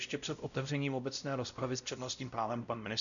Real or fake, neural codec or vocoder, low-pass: fake; codec, 16 kHz, 0.5 kbps, X-Codec, WavLM features, trained on Multilingual LibriSpeech; 7.2 kHz